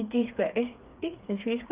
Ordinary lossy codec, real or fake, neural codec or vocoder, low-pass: Opus, 32 kbps; fake; codec, 16 kHz, 4 kbps, FreqCodec, smaller model; 3.6 kHz